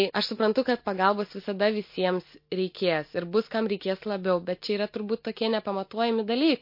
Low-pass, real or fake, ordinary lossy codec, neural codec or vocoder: 5.4 kHz; real; MP3, 32 kbps; none